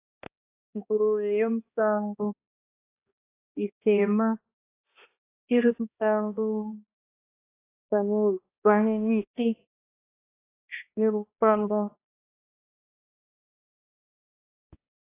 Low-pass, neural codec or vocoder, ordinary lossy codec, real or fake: 3.6 kHz; codec, 16 kHz, 1 kbps, X-Codec, HuBERT features, trained on balanced general audio; AAC, 24 kbps; fake